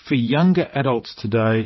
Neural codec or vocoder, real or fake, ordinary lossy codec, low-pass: vocoder, 22.05 kHz, 80 mel bands, WaveNeXt; fake; MP3, 24 kbps; 7.2 kHz